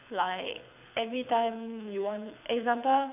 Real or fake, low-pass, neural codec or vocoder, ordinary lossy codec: fake; 3.6 kHz; codec, 24 kHz, 6 kbps, HILCodec; none